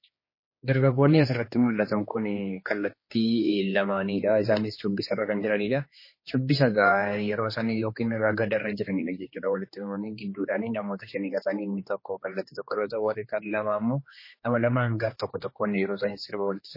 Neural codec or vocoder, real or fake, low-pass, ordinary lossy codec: codec, 16 kHz, 2 kbps, X-Codec, HuBERT features, trained on general audio; fake; 5.4 kHz; MP3, 24 kbps